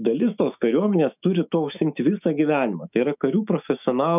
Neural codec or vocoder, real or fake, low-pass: autoencoder, 48 kHz, 128 numbers a frame, DAC-VAE, trained on Japanese speech; fake; 3.6 kHz